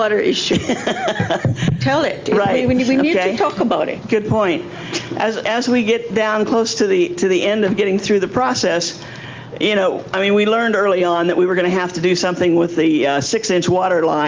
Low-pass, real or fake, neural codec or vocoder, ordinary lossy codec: 7.2 kHz; real; none; Opus, 32 kbps